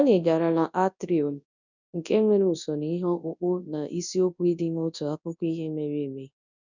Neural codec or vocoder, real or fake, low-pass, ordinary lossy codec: codec, 24 kHz, 0.9 kbps, WavTokenizer, large speech release; fake; 7.2 kHz; none